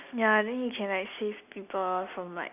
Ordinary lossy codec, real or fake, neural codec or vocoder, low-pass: none; real; none; 3.6 kHz